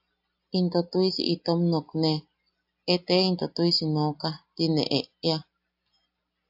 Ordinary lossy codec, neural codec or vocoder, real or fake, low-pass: AAC, 48 kbps; none; real; 5.4 kHz